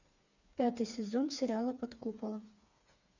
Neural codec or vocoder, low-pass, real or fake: codec, 16 kHz, 4 kbps, FreqCodec, smaller model; 7.2 kHz; fake